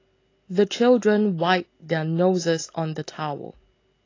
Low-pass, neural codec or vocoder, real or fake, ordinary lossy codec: 7.2 kHz; none; real; AAC, 32 kbps